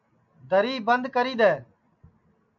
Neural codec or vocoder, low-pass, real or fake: none; 7.2 kHz; real